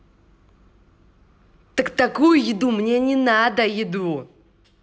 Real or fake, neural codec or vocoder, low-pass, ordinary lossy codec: real; none; none; none